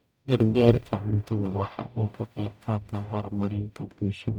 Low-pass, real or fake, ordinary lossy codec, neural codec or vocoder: 19.8 kHz; fake; none; codec, 44.1 kHz, 0.9 kbps, DAC